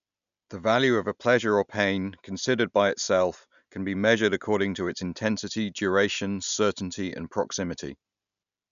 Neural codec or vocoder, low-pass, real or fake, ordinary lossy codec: none; 7.2 kHz; real; none